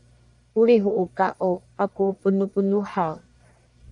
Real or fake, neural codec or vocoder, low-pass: fake; codec, 44.1 kHz, 1.7 kbps, Pupu-Codec; 10.8 kHz